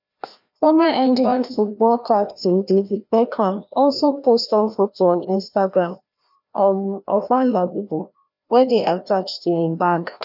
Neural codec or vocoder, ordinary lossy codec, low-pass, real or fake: codec, 16 kHz, 1 kbps, FreqCodec, larger model; none; 5.4 kHz; fake